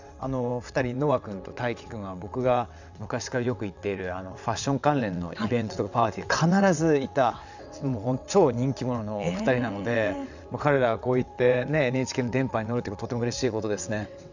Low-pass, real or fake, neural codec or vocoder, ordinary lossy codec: 7.2 kHz; fake; vocoder, 22.05 kHz, 80 mel bands, WaveNeXt; none